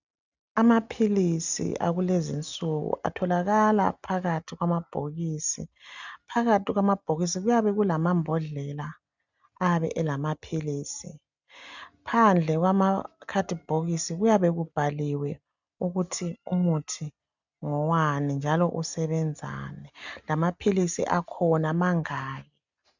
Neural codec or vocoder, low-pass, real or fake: none; 7.2 kHz; real